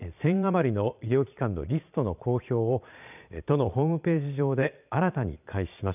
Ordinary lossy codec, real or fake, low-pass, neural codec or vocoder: none; fake; 3.6 kHz; vocoder, 22.05 kHz, 80 mel bands, Vocos